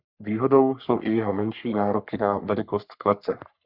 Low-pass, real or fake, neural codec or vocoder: 5.4 kHz; fake; codec, 44.1 kHz, 3.4 kbps, Pupu-Codec